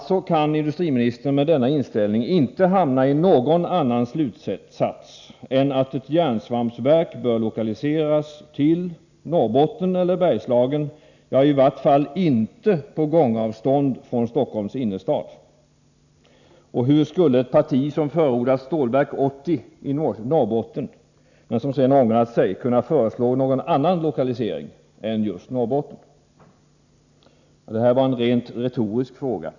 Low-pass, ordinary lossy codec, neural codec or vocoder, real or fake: 7.2 kHz; none; none; real